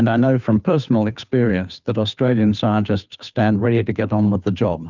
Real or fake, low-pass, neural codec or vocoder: fake; 7.2 kHz; codec, 16 kHz, 2 kbps, FunCodec, trained on Chinese and English, 25 frames a second